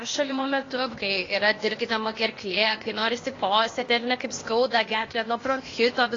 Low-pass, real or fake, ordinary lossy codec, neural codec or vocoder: 7.2 kHz; fake; AAC, 32 kbps; codec, 16 kHz, 0.8 kbps, ZipCodec